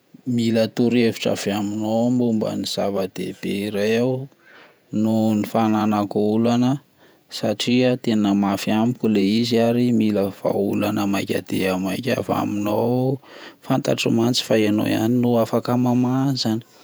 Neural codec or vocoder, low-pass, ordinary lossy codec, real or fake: vocoder, 48 kHz, 128 mel bands, Vocos; none; none; fake